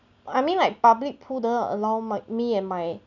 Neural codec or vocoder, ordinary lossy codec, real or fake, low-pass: none; none; real; 7.2 kHz